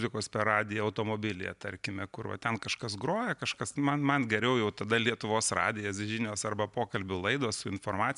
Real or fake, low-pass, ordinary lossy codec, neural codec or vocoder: real; 10.8 kHz; MP3, 96 kbps; none